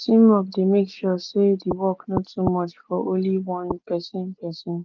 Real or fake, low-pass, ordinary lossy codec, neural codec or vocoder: real; 7.2 kHz; Opus, 32 kbps; none